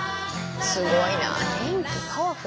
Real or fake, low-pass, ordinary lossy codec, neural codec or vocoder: real; none; none; none